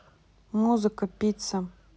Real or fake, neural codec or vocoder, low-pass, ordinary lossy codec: real; none; none; none